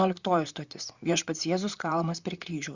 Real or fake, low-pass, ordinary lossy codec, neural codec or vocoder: fake; 7.2 kHz; Opus, 64 kbps; vocoder, 44.1 kHz, 128 mel bands, Pupu-Vocoder